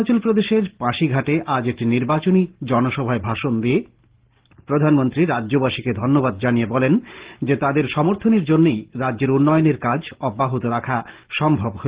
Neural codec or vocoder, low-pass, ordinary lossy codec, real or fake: none; 3.6 kHz; Opus, 16 kbps; real